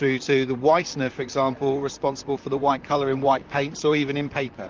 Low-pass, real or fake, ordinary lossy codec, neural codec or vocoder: 7.2 kHz; fake; Opus, 24 kbps; vocoder, 44.1 kHz, 128 mel bands, Pupu-Vocoder